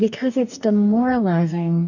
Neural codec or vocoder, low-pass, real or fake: codec, 44.1 kHz, 2.6 kbps, DAC; 7.2 kHz; fake